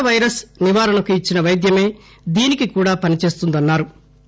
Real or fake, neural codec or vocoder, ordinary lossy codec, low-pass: real; none; none; none